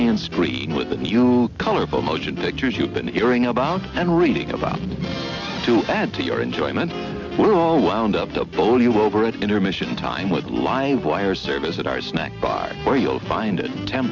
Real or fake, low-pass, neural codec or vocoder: real; 7.2 kHz; none